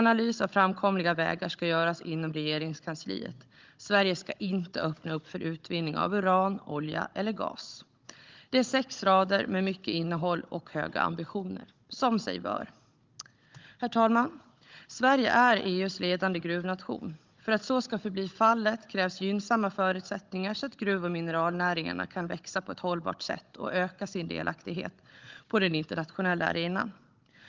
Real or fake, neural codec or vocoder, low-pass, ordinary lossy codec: fake; codec, 16 kHz, 16 kbps, FunCodec, trained on Chinese and English, 50 frames a second; 7.2 kHz; Opus, 24 kbps